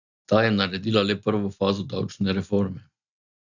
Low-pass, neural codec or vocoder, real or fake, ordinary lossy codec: 7.2 kHz; none; real; none